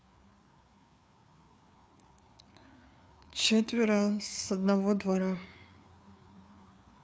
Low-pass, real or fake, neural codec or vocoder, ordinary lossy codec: none; fake; codec, 16 kHz, 4 kbps, FreqCodec, larger model; none